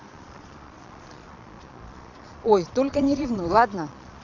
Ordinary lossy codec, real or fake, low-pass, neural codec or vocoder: AAC, 48 kbps; fake; 7.2 kHz; vocoder, 22.05 kHz, 80 mel bands, Vocos